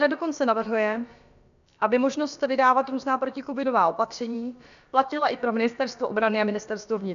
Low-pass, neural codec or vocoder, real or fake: 7.2 kHz; codec, 16 kHz, about 1 kbps, DyCAST, with the encoder's durations; fake